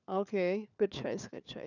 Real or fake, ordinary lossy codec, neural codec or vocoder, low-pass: fake; none; codec, 16 kHz, 4 kbps, FunCodec, trained on LibriTTS, 50 frames a second; 7.2 kHz